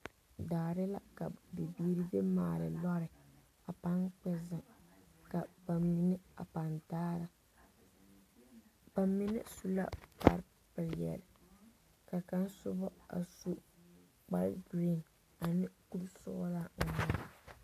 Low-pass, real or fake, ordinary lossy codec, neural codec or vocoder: 14.4 kHz; real; AAC, 64 kbps; none